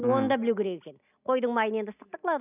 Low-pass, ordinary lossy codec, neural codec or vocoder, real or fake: 3.6 kHz; none; none; real